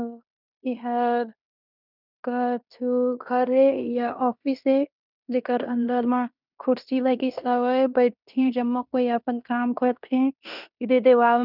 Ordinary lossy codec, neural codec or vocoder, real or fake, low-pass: none; codec, 16 kHz in and 24 kHz out, 0.9 kbps, LongCat-Audio-Codec, fine tuned four codebook decoder; fake; 5.4 kHz